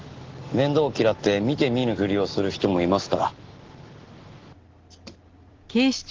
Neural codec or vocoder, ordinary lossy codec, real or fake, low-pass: none; Opus, 16 kbps; real; 7.2 kHz